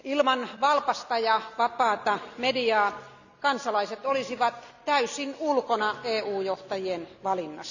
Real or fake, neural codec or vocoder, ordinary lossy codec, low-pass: real; none; none; 7.2 kHz